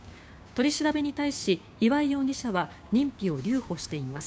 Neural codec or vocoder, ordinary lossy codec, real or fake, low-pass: codec, 16 kHz, 6 kbps, DAC; none; fake; none